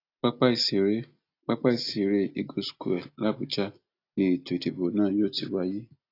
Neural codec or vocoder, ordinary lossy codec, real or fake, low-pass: none; AAC, 32 kbps; real; 5.4 kHz